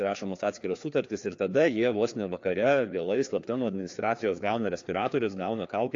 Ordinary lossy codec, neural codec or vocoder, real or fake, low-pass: AAC, 48 kbps; codec, 16 kHz, 2 kbps, FreqCodec, larger model; fake; 7.2 kHz